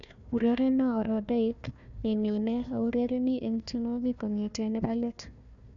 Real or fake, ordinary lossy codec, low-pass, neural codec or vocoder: fake; none; 7.2 kHz; codec, 16 kHz, 1 kbps, FunCodec, trained on Chinese and English, 50 frames a second